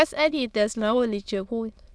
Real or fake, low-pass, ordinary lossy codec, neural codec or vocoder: fake; none; none; autoencoder, 22.05 kHz, a latent of 192 numbers a frame, VITS, trained on many speakers